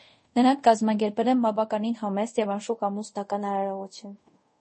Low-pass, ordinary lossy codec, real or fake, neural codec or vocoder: 10.8 kHz; MP3, 32 kbps; fake; codec, 24 kHz, 0.5 kbps, DualCodec